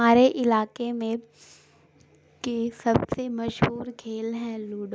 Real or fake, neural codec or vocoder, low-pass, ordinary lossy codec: real; none; none; none